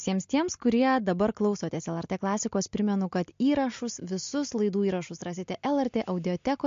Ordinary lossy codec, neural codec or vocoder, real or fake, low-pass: MP3, 48 kbps; none; real; 7.2 kHz